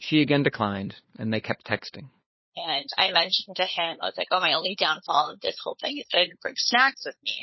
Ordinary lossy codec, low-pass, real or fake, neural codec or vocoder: MP3, 24 kbps; 7.2 kHz; fake; codec, 16 kHz, 4 kbps, FunCodec, trained on LibriTTS, 50 frames a second